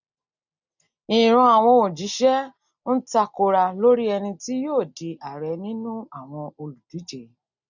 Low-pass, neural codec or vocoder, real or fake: 7.2 kHz; none; real